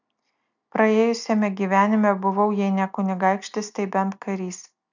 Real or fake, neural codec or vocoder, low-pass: real; none; 7.2 kHz